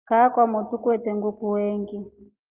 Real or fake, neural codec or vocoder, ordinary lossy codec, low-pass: real; none; Opus, 32 kbps; 3.6 kHz